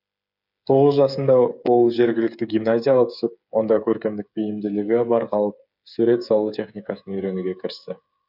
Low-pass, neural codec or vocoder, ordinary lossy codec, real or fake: 5.4 kHz; codec, 16 kHz, 8 kbps, FreqCodec, smaller model; none; fake